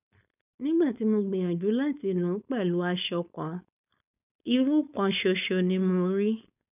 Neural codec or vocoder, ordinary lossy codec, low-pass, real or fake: codec, 16 kHz, 4.8 kbps, FACodec; none; 3.6 kHz; fake